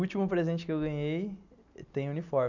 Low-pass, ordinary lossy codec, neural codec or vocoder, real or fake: 7.2 kHz; none; none; real